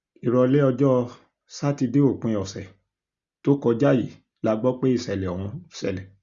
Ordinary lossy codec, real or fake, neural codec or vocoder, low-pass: Opus, 64 kbps; real; none; 7.2 kHz